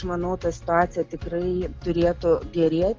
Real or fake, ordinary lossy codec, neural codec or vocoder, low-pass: real; Opus, 32 kbps; none; 7.2 kHz